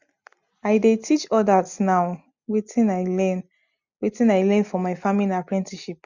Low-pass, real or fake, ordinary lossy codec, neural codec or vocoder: 7.2 kHz; real; none; none